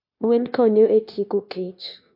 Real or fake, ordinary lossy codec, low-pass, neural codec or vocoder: fake; MP3, 32 kbps; 5.4 kHz; codec, 16 kHz, 0.9 kbps, LongCat-Audio-Codec